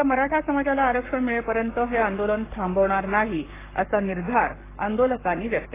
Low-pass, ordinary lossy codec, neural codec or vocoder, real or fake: 3.6 kHz; AAC, 16 kbps; codec, 44.1 kHz, 7.8 kbps, Pupu-Codec; fake